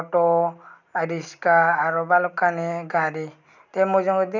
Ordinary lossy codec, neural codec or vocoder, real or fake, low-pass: none; none; real; 7.2 kHz